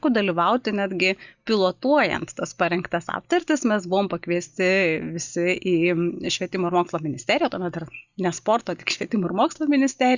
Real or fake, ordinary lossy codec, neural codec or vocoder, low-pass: real; Opus, 64 kbps; none; 7.2 kHz